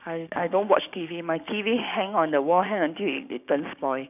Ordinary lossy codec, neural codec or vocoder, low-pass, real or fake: none; codec, 16 kHz, 6 kbps, DAC; 3.6 kHz; fake